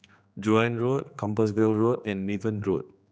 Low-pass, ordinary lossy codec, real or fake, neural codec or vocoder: none; none; fake; codec, 16 kHz, 2 kbps, X-Codec, HuBERT features, trained on general audio